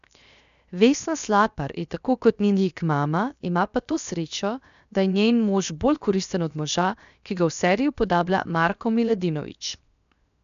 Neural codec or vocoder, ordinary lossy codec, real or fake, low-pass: codec, 16 kHz, 0.7 kbps, FocalCodec; none; fake; 7.2 kHz